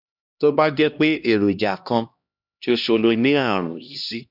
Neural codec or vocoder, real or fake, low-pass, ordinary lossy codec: codec, 16 kHz, 1 kbps, X-Codec, HuBERT features, trained on LibriSpeech; fake; 5.4 kHz; none